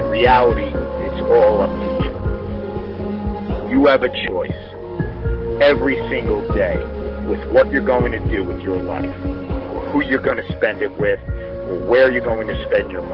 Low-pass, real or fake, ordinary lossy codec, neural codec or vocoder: 5.4 kHz; fake; Opus, 16 kbps; codec, 44.1 kHz, 7.8 kbps, DAC